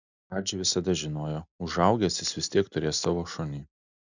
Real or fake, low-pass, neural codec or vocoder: real; 7.2 kHz; none